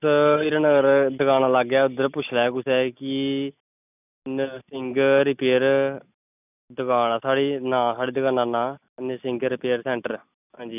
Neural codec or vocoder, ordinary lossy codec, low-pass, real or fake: none; none; 3.6 kHz; real